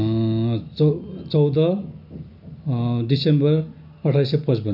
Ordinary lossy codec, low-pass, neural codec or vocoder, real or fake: none; 5.4 kHz; none; real